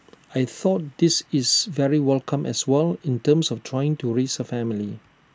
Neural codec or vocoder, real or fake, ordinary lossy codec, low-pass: none; real; none; none